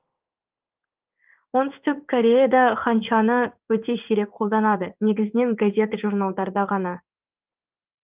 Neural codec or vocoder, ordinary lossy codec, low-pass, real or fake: codec, 16 kHz in and 24 kHz out, 1 kbps, XY-Tokenizer; Opus, 24 kbps; 3.6 kHz; fake